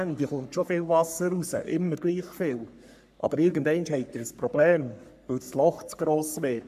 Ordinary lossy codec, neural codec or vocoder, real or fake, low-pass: AAC, 96 kbps; codec, 44.1 kHz, 3.4 kbps, Pupu-Codec; fake; 14.4 kHz